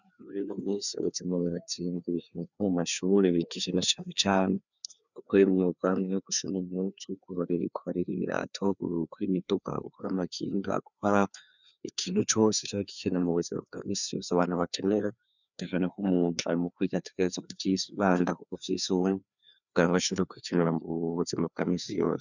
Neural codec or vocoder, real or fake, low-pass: codec, 16 kHz, 2 kbps, FreqCodec, larger model; fake; 7.2 kHz